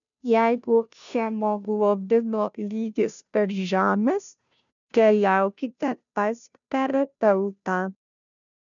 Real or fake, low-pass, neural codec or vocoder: fake; 7.2 kHz; codec, 16 kHz, 0.5 kbps, FunCodec, trained on Chinese and English, 25 frames a second